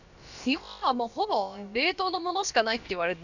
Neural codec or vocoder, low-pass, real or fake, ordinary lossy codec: codec, 16 kHz, about 1 kbps, DyCAST, with the encoder's durations; 7.2 kHz; fake; none